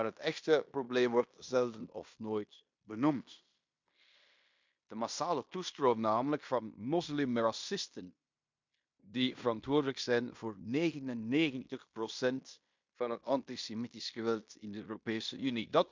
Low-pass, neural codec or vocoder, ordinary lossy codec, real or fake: 7.2 kHz; codec, 16 kHz in and 24 kHz out, 0.9 kbps, LongCat-Audio-Codec, fine tuned four codebook decoder; MP3, 64 kbps; fake